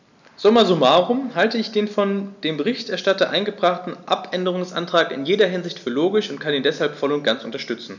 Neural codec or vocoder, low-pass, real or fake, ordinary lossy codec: none; 7.2 kHz; real; none